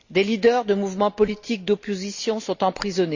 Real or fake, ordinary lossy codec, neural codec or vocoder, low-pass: real; Opus, 64 kbps; none; 7.2 kHz